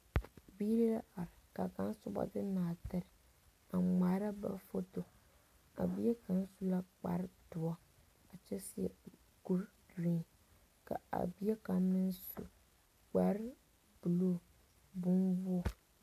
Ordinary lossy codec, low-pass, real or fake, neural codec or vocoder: AAC, 64 kbps; 14.4 kHz; real; none